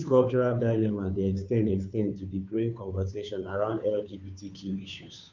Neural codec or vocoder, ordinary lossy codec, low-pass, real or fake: codec, 16 kHz, 2 kbps, FunCodec, trained on Chinese and English, 25 frames a second; none; 7.2 kHz; fake